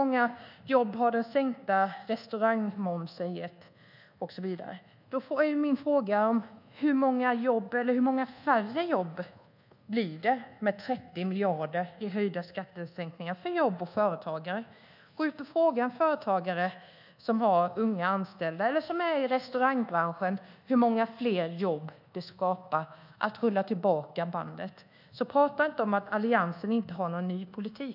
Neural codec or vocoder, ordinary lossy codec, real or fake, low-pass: codec, 24 kHz, 1.2 kbps, DualCodec; none; fake; 5.4 kHz